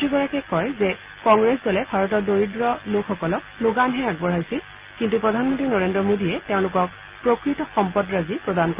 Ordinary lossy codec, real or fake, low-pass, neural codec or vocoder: Opus, 24 kbps; real; 3.6 kHz; none